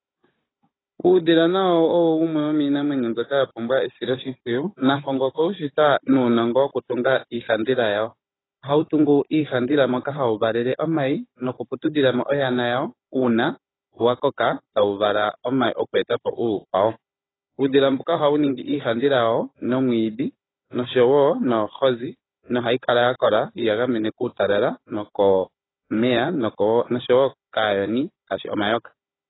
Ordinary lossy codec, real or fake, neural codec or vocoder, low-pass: AAC, 16 kbps; fake; codec, 16 kHz, 16 kbps, FunCodec, trained on Chinese and English, 50 frames a second; 7.2 kHz